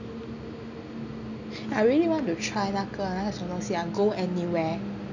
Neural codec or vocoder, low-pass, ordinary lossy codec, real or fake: none; 7.2 kHz; none; real